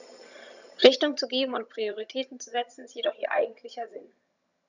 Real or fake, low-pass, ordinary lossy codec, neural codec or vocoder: fake; 7.2 kHz; none; vocoder, 22.05 kHz, 80 mel bands, HiFi-GAN